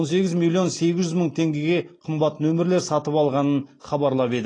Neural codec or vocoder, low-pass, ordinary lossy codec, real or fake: none; 9.9 kHz; AAC, 32 kbps; real